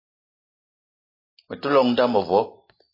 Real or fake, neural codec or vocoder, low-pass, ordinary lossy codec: real; none; 5.4 kHz; MP3, 24 kbps